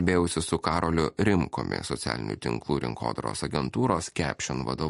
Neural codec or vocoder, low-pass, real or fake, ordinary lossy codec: none; 14.4 kHz; real; MP3, 48 kbps